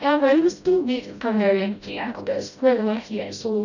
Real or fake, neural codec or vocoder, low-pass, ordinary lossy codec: fake; codec, 16 kHz, 0.5 kbps, FreqCodec, smaller model; 7.2 kHz; none